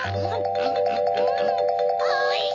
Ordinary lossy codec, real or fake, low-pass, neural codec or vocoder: none; real; 7.2 kHz; none